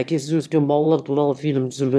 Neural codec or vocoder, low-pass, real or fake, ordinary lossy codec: autoencoder, 22.05 kHz, a latent of 192 numbers a frame, VITS, trained on one speaker; none; fake; none